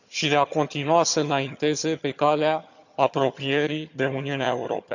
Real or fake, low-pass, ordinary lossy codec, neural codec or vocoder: fake; 7.2 kHz; none; vocoder, 22.05 kHz, 80 mel bands, HiFi-GAN